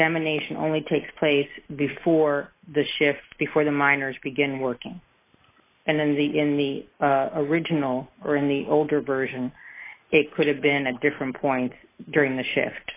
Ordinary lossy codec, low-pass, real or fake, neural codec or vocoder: AAC, 24 kbps; 3.6 kHz; real; none